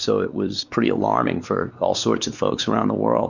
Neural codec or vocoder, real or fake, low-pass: codec, 16 kHz, 4 kbps, X-Codec, WavLM features, trained on Multilingual LibriSpeech; fake; 7.2 kHz